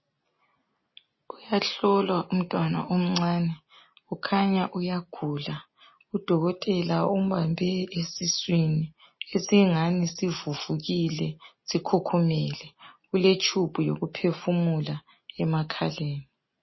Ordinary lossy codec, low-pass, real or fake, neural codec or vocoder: MP3, 24 kbps; 7.2 kHz; real; none